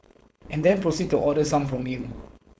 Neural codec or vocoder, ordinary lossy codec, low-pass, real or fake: codec, 16 kHz, 4.8 kbps, FACodec; none; none; fake